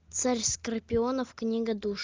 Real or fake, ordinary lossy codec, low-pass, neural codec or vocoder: real; Opus, 32 kbps; 7.2 kHz; none